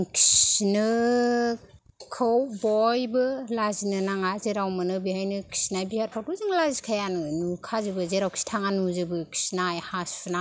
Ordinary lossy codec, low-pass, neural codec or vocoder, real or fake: none; none; none; real